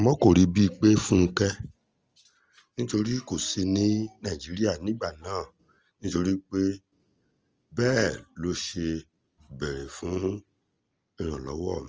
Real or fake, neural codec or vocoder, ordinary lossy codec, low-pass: real; none; Opus, 24 kbps; 7.2 kHz